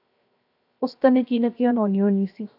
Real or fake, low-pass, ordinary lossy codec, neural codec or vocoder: fake; 5.4 kHz; AAC, 48 kbps; codec, 16 kHz, 0.7 kbps, FocalCodec